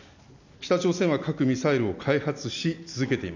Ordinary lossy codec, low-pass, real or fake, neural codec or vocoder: none; 7.2 kHz; real; none